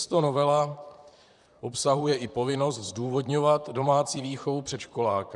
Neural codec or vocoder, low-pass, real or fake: vocoder, 44.1 kHz, 128 mel bands, Pupu-Vocoder; 10.8 kHz; fake